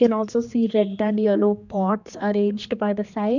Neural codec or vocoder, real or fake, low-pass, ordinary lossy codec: codec, 16 kHz, 2 kbps, X-Codec, HuBERT features, trained on general audio; fake; 7.2 kHz; none